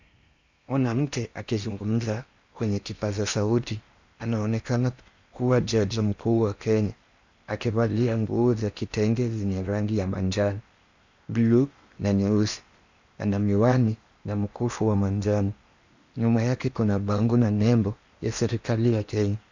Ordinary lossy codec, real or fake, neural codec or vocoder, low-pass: Opus, 64 kbps; fake; codec, 16 kHz in and 24 kHz out, 0.8 kbps, FocalCodec, streaming, 65536 codes; 7.2 kHz